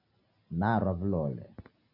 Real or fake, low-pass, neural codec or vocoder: real; 5.4 kHz; none